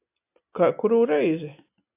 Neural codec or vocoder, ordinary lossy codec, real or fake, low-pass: none; AAC, 24 kbps; real; 3.6 kHz